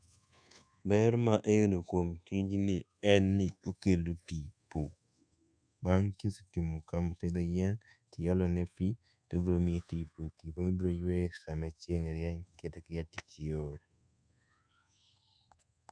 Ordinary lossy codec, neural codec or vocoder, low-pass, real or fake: none; codec, 24 kHz, 1.2 kbps, DualCodec; 9.9 kHz; fake